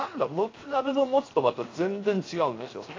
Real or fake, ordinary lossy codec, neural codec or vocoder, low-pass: fake; AAC, 32 kbps; codec, 16 kHz, 0.7 kbps, FocalCodec; 7.2 kHz